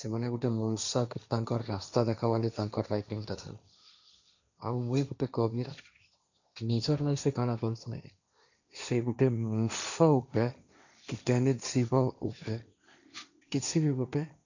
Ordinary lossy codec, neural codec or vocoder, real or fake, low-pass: none; codec, 16 kHz, 1.1 kbps, Voila-Tokenizer; fake; 7.2 kHz